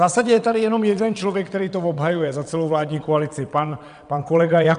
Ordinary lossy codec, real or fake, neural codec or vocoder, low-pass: MP3, 96 kbps; fake; vocoder, 22.05 kHz, 80 mel bands, WaveNeXt; 9.9 kHz